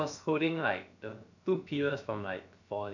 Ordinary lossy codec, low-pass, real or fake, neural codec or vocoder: none; 7.2 kHz; fake; codec, 16 kHz, about 1 kbps, DyCAST, with the encoder's durations